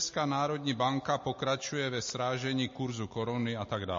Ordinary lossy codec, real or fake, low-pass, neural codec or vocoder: MP3, 32 kbps; real; 7.2 kHz; none